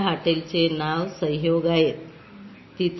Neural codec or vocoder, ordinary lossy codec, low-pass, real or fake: none; MP3, 24 kbps; 7.2 kHz; real